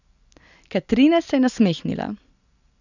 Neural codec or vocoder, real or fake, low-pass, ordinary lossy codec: none; real; 7.2 kHz; none